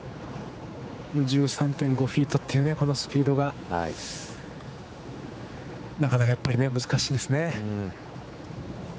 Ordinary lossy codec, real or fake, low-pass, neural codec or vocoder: none; fake; none; codec, 16 kHz, 4 kbps, X-Codec, HuBERT features, trained on general audio